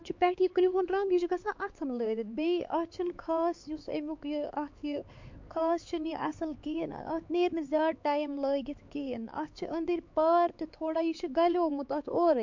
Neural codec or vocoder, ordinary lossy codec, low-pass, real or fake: codec, 16 kHz, 4 kbps, X-Codec, HuBERT features, trained on LibriSpeech; MP3, 48 kbps; 7.2 kHz; fake